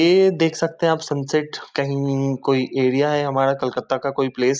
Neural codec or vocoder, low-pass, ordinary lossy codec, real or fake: none; none; none; real